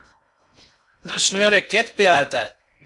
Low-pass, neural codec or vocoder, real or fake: 10.8 kHz; codec, 16 kHz in and 24 kHz out, 0.6 kbps, FocalCodec, streaming, 2048 codes; fake